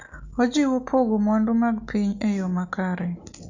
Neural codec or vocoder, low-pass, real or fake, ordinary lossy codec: none; 7.2 kHz; real; Opus, 64 kbps